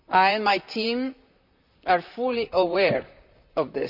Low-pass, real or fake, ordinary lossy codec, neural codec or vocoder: 5.4 kHz; fake; none; vocoder, 44.1 kHz, 128 mel bands, Pupu-Vocoder